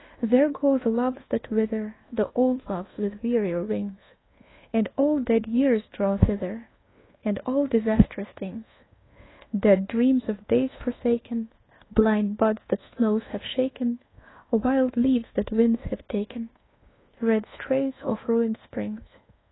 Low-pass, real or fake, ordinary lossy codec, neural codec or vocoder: 7.2 kHz; fake; AAC, 16 kbps; codec, 24 kHz, 1.2 kbps, DualCodec